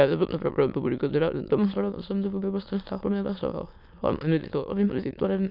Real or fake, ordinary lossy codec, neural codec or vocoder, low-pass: fake; none; autoencoder, 22.05 kHz, a latent of 192 numbers a frame, VITS, trained on many speakers; 5.4 kHz